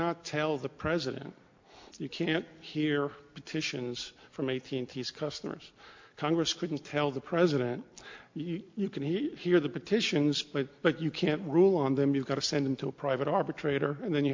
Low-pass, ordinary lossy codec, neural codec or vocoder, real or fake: 7.2 kHz; MP3, 48 kbps; none; real